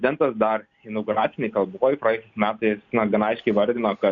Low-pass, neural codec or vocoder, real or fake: 7.2 kHz; none; real